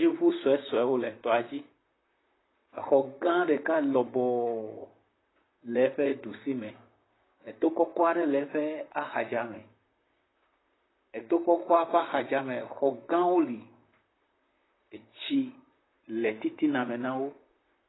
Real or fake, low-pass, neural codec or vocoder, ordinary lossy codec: fake; 7.2 kHz; vocoder, 44.1 kHz, 128 mel bands, Pupu-Vocoder; AAC, 16 kbps